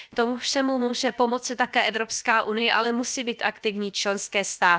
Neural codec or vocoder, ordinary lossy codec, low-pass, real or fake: codec, 16 kHz, about 1 kbps, DyCAST, with the encoder's durations; none; none; fake